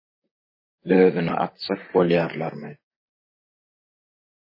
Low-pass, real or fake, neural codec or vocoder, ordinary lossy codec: 5.4 kHz; fake; vocoder, 44.1 kHz, 128 mel bands, Pupu-Vocoder; MP3, 24 kbps